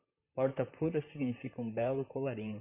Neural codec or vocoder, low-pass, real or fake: vocoder, 44.1 kHz, 128 mel bands, Pupu-Vocoder; 3.6 kHz; fake